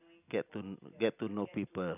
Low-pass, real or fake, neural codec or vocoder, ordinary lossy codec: 3.6 kHz; real; none; none